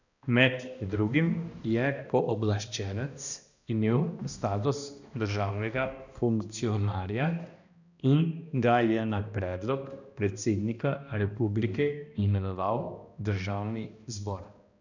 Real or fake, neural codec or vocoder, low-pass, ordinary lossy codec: fake; codec, 16 kHz, 1 kbps, X-Codec, HuBERT features, trained on balanced general audio; 7.2 kHz; none